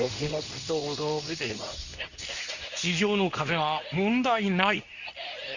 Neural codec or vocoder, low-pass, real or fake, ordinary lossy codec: codec, 24 kHz, 0.9 kbps, WavTokenizer, medium speech release version 2; 7.2 kHz; fake; none